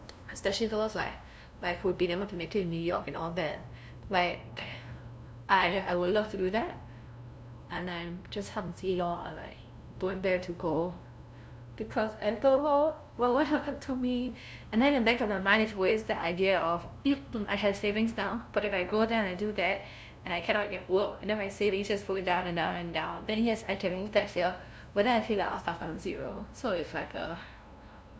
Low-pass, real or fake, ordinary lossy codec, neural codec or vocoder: none; fake; none; codec, 16 kHz, 0.5 kbps, FunCodec, trained on LibriTTS, 25 frames a second